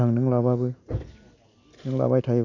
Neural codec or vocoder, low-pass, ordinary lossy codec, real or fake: none; 7.2 kHz; none; real